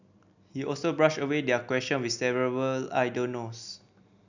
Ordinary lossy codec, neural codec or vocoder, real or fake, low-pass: none; none; real; 7.2 kHz